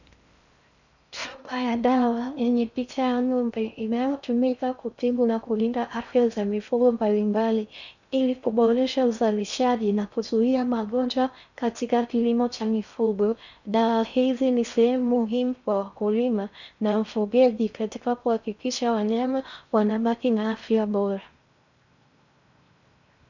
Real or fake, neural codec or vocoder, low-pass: fake; codec, 16 kHz in and 24 kHz out, 0.6 kbps, FocalCodec, streaming, 4096 codes; 7.2 kHz